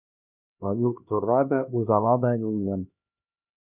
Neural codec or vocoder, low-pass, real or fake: codec, 16 kHz, 1 kbps, X-Codec, HuBERT features, trained on LibriSpeech; 3.6 kHz; fake